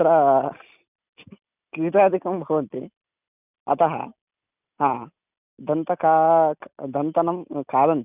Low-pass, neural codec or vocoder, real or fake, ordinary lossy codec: 3.6 kHz; none; real; none